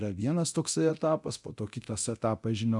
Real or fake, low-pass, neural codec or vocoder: fake; 10.8 kHz; codec, 24 kHz, 0.9 kbps, DualCodec